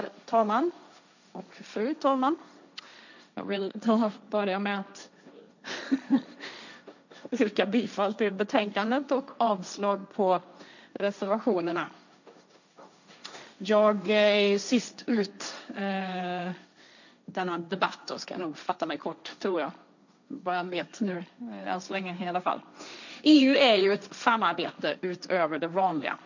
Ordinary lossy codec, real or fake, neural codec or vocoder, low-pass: none; fake; codec, 16 kHz, 1.1 kbps, Voila-Tokenizer; 7.2 kHz